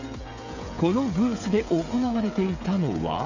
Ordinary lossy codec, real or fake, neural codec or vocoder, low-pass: none; fake; codec, 16 kHz, 16 kbps, FreqCodec, smaller model; 7.2 kHz